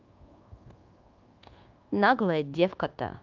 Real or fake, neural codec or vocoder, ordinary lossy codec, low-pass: fake; codec, 24 kHz, 1.2 kbps, DualCodec; Opus, 32 kbps; 7.2 kHz